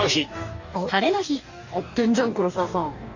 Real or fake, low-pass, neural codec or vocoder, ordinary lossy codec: fake; 7.2 kHz; codec, 44.1 kHz, 2.6 kbps, DAC; Opus, 64 kbps